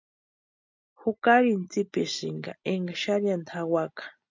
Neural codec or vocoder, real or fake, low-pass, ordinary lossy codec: none; real; 7.2 kHz; MP3, 64 kbps